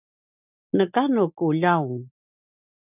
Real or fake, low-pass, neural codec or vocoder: real; 3.6 kHz; none